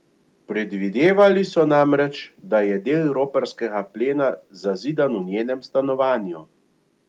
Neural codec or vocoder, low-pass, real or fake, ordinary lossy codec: none; 19.8 kHz; real; Opus, 32 kbps